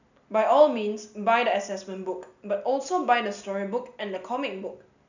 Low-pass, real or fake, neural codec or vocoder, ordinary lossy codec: 7.2 kHz; real; none; none